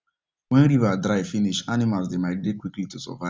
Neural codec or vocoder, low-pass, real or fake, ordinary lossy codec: none; none; real; none